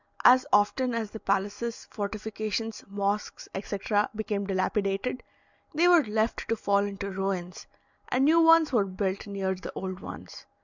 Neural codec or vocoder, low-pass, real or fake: none; 7.2 kHz; real